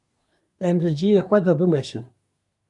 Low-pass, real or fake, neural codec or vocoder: 10.8 kHz; fake; codec, 24 kHz, 1 kbps, SNAC